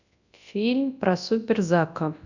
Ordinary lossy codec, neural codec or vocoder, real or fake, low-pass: none; codec, 24 kHz, 0.9 kbps, WavTokenizer, large speech release; fake; 7.2 kHz